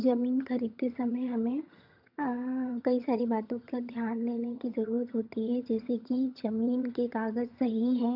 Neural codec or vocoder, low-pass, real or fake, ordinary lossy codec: vocoder, 22.05 kHz, 80 mel bands, HiFi-GAN; 5.4 kHz; fake; none